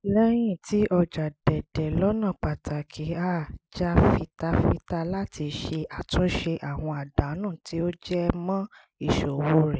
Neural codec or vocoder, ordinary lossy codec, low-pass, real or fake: none; none; none; real